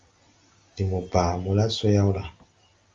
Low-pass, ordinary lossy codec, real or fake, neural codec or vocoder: 7.2 kHz; Opus, 32 kbps; real; none